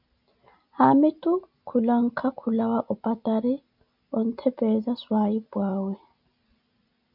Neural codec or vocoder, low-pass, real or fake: none; 5.4 kHz; real